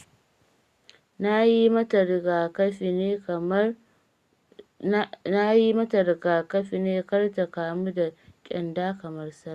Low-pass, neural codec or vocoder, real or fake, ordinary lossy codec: 14.4 kHz; none; real; Opus, 64 kbps